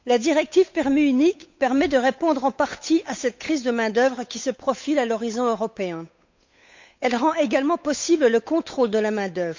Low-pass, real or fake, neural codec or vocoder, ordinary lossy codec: 7.2 kHz; fake; codec, 16 kHz, 8 kbps, FunCodec, trained on Chinese and English, 25 frames a second; MP3, 64 kbps